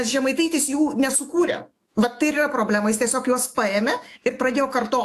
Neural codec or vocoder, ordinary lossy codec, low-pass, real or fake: autoencoder, 48 kHz, 128 numbers a frame, DAC-VAE, trained on Japanese speech; AAC, 64 kbps; 14.4 kHz; fake